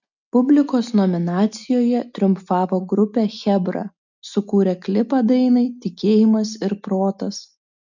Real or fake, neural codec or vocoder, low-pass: real; none; 7.2 kHz